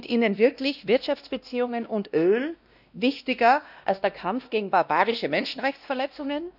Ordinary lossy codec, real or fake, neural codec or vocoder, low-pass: none; fake; codec, 16 kHz, 1 kbps, X-Codec, WavLM features, trained on Multilingual LibriSpeech; 5.4 kHz